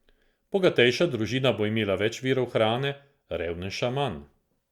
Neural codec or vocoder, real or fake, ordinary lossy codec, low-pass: none; real; Opus, 64 kbps; 19.8 kHz